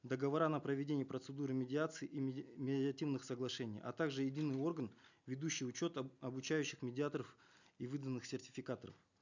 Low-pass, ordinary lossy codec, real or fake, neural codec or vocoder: 7.2 kHz; none; real; none